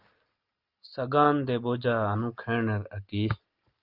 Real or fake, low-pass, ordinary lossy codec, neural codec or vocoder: real; 5.4 kHz; Opus, 32 kbps; none